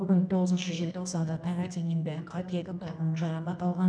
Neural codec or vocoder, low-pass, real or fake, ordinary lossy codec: codec, 24 kHz, 0.9 kbps, WavTokenizer, medium music audio release; 9.9 kHz; fake; none